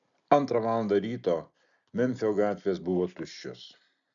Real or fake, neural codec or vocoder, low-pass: real; none; 7.2 kHz